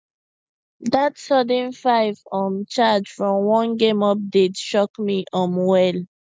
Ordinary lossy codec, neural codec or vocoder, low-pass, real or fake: none; none; none; real